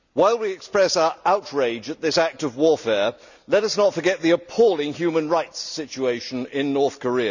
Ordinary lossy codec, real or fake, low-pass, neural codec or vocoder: none; real; 7.2 kHz; none